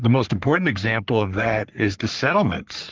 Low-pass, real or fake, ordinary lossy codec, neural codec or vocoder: 7.2 kHz; fake; Opus, 32 kbps; codec, 44.1 kHz, 3.4 kbps, Pupu-Codec